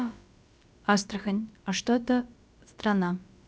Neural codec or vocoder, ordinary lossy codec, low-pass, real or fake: codec, 16 kHz, about 1 kbps, DyCAST, with the encoder's durations; none; none; fake